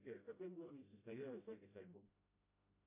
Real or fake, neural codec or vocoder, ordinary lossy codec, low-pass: fake; codec, 16 kHz, 0.5 kbps, FreqCodec, smaller model; AAC, 32 kbps; 3.6 kHz